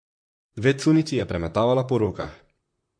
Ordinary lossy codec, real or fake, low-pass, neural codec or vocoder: MP3, 48 kbps; fake; 9.9 kHz; vocoder, 44.1 kHz, 128 mel bands, Pupu-Vocoder